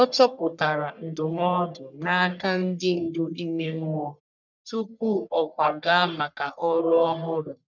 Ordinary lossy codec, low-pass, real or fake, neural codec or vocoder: none; 7.2 kHz; fake; codec, 44.1 kHz, 1.7 kbps, Pupu-Codec